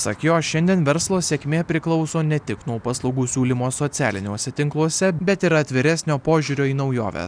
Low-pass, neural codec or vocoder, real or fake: 9.9 kHz; none; real